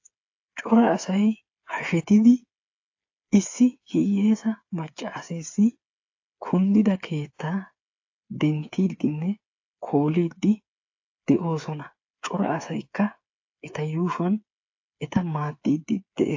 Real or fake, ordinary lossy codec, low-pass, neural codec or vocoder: fake; AAC, 48 kbps; 7.2 kHz; codec, 16 kHz, 8 kbps, FreqCodec, smaller model